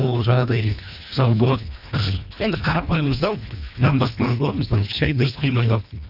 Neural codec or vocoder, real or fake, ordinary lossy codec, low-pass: codec, 24 kHz, 1.5 kbps, HILCodec; fake; none; 5.4 kHz